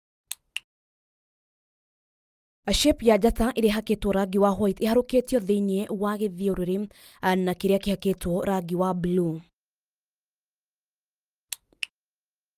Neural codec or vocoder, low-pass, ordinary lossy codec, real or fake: none; 14.4 kHz; Opus, 32 kbps; real